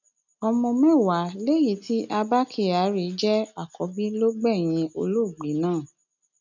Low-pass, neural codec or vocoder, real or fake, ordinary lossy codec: 7.2 kHz; none; real; none